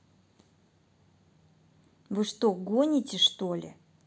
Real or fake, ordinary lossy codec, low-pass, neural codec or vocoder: real; none; none; none